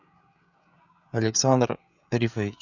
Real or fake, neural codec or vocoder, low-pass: fake; codec, 16 kHz, 16 kbps, FreqCodec, smaller model; 7.2 kHz